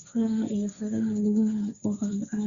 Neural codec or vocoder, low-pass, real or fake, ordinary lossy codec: codec, 16 kHz, 1.1 kbps, Voila-Tokenizer; 7.2 kHz; fake; Opus, 64 kbps